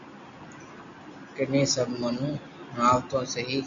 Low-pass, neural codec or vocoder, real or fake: 7.2 kHz; none; real